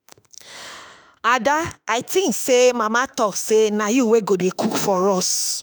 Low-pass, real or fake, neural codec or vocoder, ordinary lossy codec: none; fake; autoencoder, 48 kHz, 32 numbers a frame, DAC-VAE, trained on Japanese speech; none